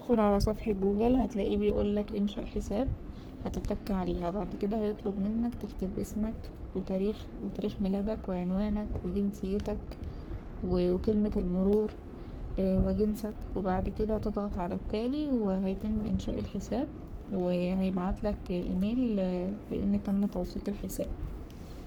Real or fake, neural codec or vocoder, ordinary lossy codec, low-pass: fake; codec, 44.1 kHz, 3.4 kbps, Pupu-Codec; none; none